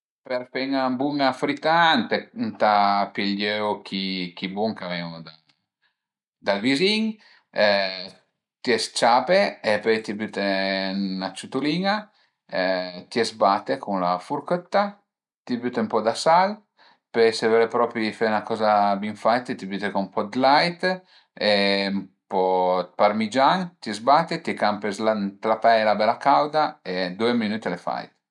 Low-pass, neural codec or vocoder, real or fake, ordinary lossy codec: 10.8 kHz; none; real; none